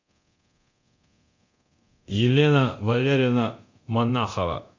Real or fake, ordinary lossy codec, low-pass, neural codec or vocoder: fake; MP3, 48 kbps; 7.2 kHz; codec, 24 kHz, 0.9 kbps, DualCodec